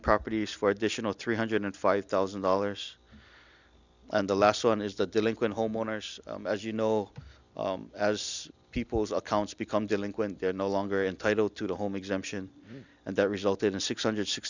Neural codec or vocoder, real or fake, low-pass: none; real; 7.2 kHz